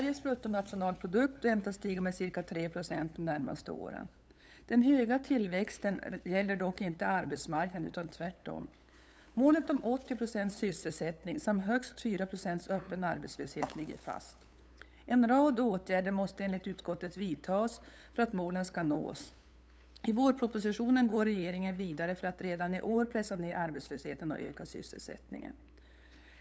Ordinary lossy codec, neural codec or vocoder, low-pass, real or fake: none; codec, 16 kHz, 8 kbps, FunCodec, trained on LibriTTS, 25 frames a second; none; fake